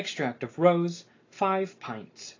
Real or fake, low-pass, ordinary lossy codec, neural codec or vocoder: real; 7.2 kHz; AAC, 32 kbps; none